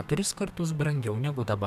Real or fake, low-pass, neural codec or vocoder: fake; 14.4 kHz; codec, 32 kHz, 1.9 kbps, SNAC